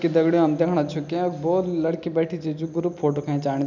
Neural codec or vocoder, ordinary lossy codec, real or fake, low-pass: none; none; real; 7.2 kHz